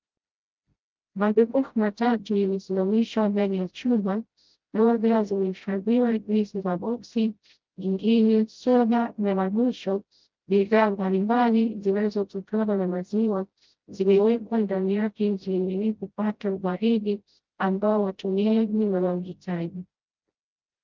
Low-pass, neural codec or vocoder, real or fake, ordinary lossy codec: 7.2 kHz; codec, 16 kHz, 0.5 kbps, FreqCodec, smaller model; fake; Opus, 32 kbps